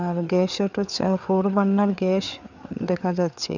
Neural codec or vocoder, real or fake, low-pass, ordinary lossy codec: codec, 16 kHz, 4 kbps, FreqCodec, larger model; fake; 7.2 kHz; none